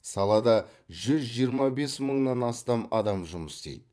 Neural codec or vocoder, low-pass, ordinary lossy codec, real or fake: vocoder, 22.05 kHz, 80 mel bands, Vocos; none; none; fake